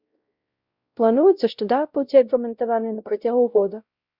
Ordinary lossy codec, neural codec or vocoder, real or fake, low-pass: Opus, 64 kbps; codec, 16 kHz, 0.5 kbps, X-Codec, WavLM features, trained on Multilingual LibriSpeech; fake; 5.4 kHz